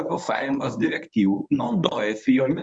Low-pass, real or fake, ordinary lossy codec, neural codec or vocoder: 10.8 kHz; fake; MP3, 96 kbps; codec, 24 kHz, 0.9 kbps, WavTokenizer, medium speech release version 1